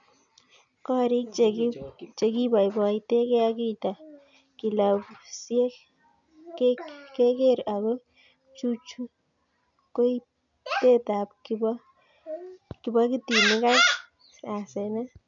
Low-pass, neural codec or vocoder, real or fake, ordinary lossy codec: 7.2 kHz; none; real; none